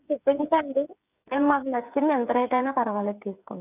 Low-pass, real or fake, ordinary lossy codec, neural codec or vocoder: 3.6 kHz; fake; none; codec, 16 kHz, 8 kbps, FreqCodec, smaller model